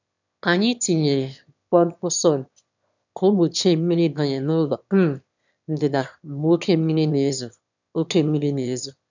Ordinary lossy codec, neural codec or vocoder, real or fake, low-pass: none; autoencoder, 22.05 kHz, a latent of 192 numbers a frame, VITS, trained on one speaker; fake; 7.2 kHz